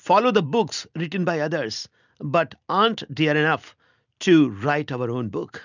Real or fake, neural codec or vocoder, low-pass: real; none; 7.2 kHz